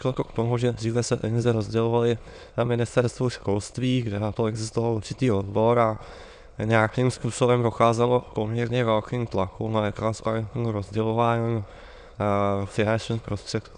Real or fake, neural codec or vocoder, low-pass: fake; autoencoder, 22.05 kHz, a latent of 192 numbers a frame, VITS, trained on many speakers; 9.9 kHz